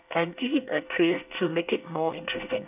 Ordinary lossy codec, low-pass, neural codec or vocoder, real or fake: none; 3.6 kHz; codec, 24 kHz, 1 kbps, SNAC; fake